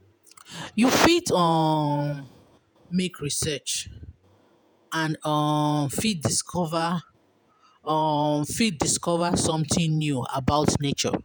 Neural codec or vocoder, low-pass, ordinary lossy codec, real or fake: vocoder, 48 kHz, 128 mel bands, Vocos; none; none; fake